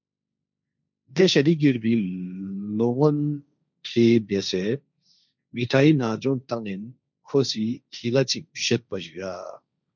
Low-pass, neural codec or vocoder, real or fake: 7.2 kHz; codec, 16 kHz, 1.1 kbps, Voila-Tokenizer; fake